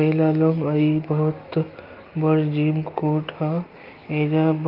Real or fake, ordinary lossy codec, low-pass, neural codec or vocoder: real; Opus, 24 kbps; 5.4 kHz; none